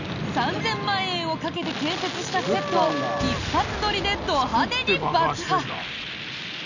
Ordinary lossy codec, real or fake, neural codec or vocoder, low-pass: none; real; none; 7.2 kHz